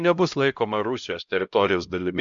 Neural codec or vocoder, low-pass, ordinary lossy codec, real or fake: codec, 16 kHz, 0.5 kbps, X-Codec, HuBERT features, trained on LibriSpeech; 7.2 kHz; MP3, 64 kbps; fake